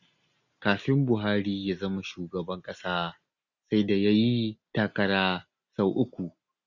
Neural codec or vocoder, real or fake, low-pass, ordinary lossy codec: none; real; 7.2 kHz; none